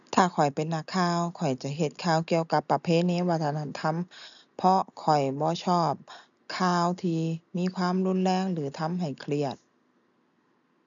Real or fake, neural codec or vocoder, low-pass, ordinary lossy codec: real; none; 7.2 kHz; none